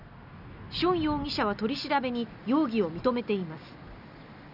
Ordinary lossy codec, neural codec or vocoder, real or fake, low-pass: none; none; real; 5.4 kHz